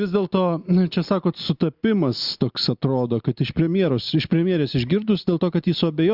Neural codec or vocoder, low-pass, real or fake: none; 5.4 kHz; real